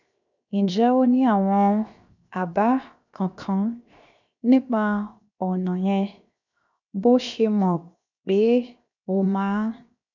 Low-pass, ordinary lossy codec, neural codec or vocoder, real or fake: 7.2 kHz; none; codec, 16 kHz, 0.7 kbps, FocalCodec; fake